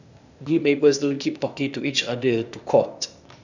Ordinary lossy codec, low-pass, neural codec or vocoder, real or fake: none; 7.2 kHz; codec, 16 kHz, 0.8 kbps, ZipCodec; fake